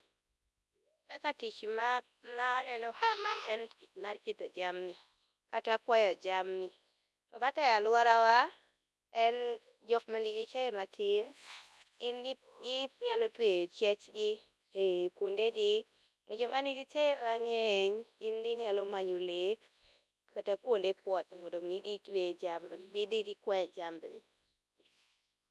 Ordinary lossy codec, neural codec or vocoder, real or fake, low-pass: none; codec, 24 kHz, 0.9 kbps, WavTokenizer, large speech release; fake; none